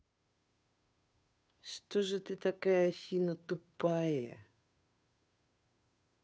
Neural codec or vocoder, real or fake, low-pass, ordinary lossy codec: codec, 16 kHz, 2 kbps, FunCodec, trained on Chinese and English, 25 frames a second; fake; none; none